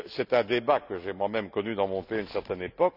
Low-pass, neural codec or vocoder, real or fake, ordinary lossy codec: 5.4 kHz; none; real; none